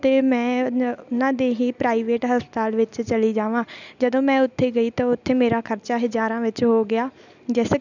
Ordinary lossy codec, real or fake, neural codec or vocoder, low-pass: none; real; none; 7.2 kHz